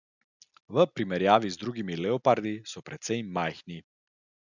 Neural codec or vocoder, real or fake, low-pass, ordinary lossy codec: none; real; 7.2 kHz; none